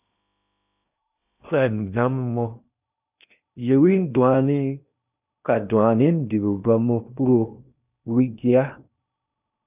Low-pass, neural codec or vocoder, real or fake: 3.6 kHz; codec, 16 kHz in and 24 kHz out, 0.8 kbps, FocalCodec, streaming, 65536 codes; fake